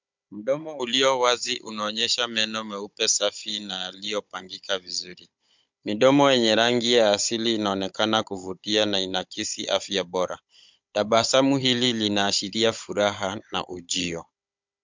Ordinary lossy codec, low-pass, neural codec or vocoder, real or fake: MP3, 64 kbps; 7.2 kHz; codec, 16 kHz, 16 kbps, FunCodec, trained on Chinese and English, 50 frames a second; fake